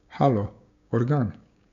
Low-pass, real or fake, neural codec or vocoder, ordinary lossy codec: 7.2 kHz; fake; codec, 16 kHz, 6 kbps, DAC; none